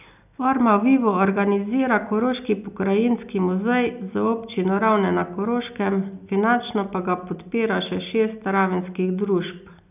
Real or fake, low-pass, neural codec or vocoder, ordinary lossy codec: real; 3.6 kHz; none; none